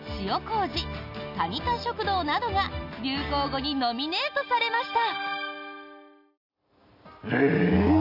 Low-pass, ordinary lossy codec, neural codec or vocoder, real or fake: 5.4 kHz; none; none; real